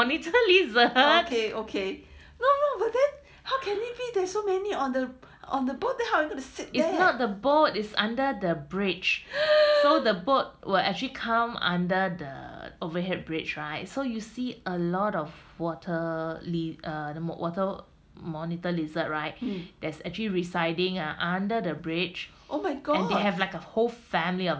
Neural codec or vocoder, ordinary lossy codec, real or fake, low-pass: none; none; real; none